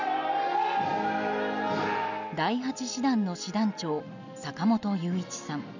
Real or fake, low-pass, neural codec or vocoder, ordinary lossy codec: real; 7.2 kHz; none; none